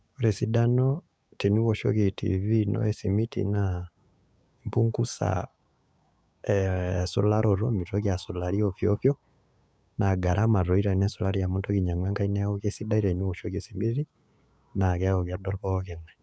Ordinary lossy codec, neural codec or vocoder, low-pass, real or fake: none; codec, 16 kHz, 6 kbps, DAC; none; fake